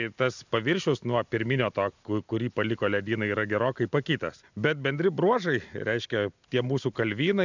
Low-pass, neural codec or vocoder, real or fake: 7.2 kHz; none; real